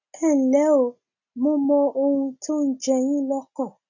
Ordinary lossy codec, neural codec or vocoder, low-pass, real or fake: none; none; 7.2 kHz; real